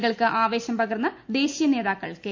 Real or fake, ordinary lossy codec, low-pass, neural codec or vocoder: real; MP3, 48 kbps; 7.2 kHz; none